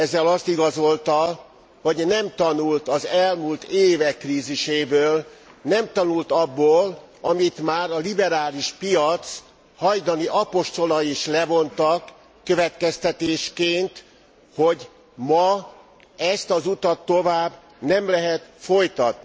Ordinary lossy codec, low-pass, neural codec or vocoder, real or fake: none; none; none; real